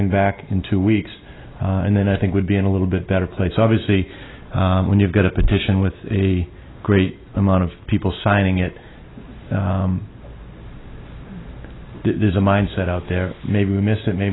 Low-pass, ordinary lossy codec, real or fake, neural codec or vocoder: 7.2 kHz; AAC, 16 kbps; real; none